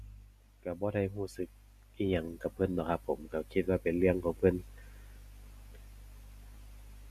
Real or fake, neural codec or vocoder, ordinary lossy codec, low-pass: real; none; none; 14.4 kHz